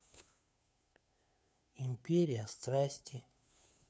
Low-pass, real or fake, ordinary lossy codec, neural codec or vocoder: none; fake; none; codec, 16 kHz, 2 kbps, FunCodec, trained on Chinese and English, 25 frames a second